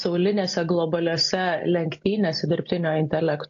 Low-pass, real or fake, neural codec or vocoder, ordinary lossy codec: 7.2 kHz; real; none; AAC, 48 kbps